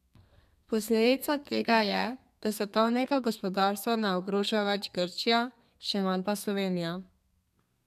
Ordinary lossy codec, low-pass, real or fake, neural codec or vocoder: none; 14.4 kHz; fake; codec, 32 kHz, 1.9 kbps, SNAC